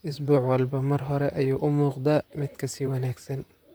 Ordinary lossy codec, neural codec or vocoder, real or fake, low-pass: none; vocoder, 44.1 kHz, 128 mel bands, Pupu-Vocoder; fake; none